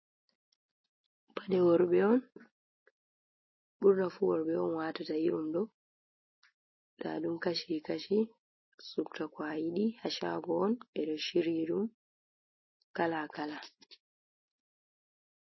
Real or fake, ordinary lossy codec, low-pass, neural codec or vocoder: real; MP3, 24 kbps; 7.2 kHz; none